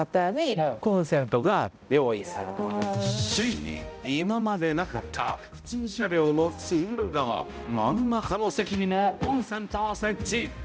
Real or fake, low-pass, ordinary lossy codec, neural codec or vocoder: fake; none; none; codec, 16 kHz, 0.5 kbps, X-Codec, HuBERT features, trained on balanced general audio